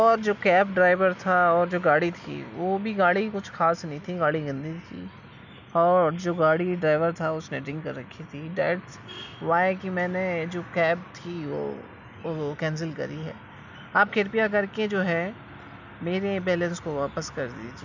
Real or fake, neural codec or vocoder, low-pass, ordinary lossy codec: real; none; 7.2 kHz; none